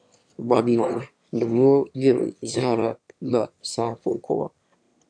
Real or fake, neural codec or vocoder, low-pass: fake; autoencoder, 22.05 kHz, a latent of 192 numbers a frame, VITS, trained on one speaker; 9.9 kHz